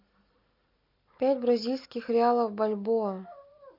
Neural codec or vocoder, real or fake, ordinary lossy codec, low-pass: none; real; MP3, 48 kbps; 5.4 kHz